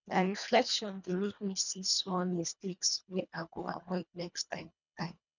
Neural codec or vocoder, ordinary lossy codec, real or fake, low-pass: codec, 24 kHz, 1.5 kbps, HILCodec; none; fake; 7.2 kHz